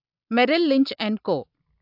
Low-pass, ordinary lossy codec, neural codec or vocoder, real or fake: 5.4 kHz; AAC, 48 kbps; none; real